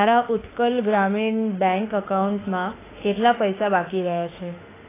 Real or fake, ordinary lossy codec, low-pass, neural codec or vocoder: fake; AAC, 16 kbps; 3.6 kHz; autoencoder, 48 kHz, 32 numbers a frame, DAC-VAE, trained on Japanese speech